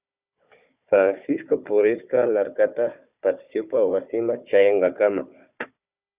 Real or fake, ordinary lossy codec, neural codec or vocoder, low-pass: fake; Opus, 64 kbps; codec, 16 kHz, 4 kbps, FunCodec, trained on Chinese and English, 50 frames a second; 3.6 kHz